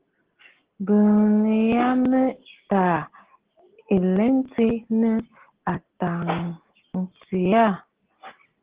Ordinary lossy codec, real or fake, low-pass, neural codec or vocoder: Opus, 16 kbps; real; 3.6 kHz; none